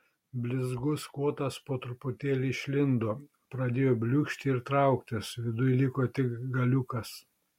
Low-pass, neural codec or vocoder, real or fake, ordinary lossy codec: 19.8 kHz; none; real; MP3, 64 kbps